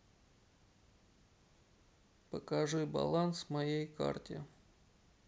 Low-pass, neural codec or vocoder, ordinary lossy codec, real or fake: none; none; none; real